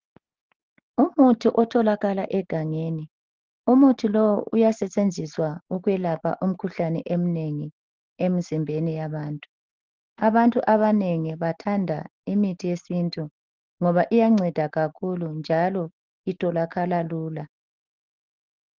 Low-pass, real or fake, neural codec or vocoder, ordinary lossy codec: 7.2 kHz; real; none; Opus, 16 kbps